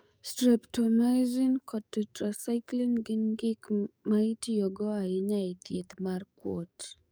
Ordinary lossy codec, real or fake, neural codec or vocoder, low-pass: none; fake; codec, 44.1 kHz, 7.8 kbps, DAC; none